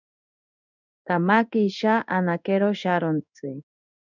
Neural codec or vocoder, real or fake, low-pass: codec, 16 kHz in and 24 kHz out, 1 kbps, XY-Tokenizer; fake; 7.2 kHz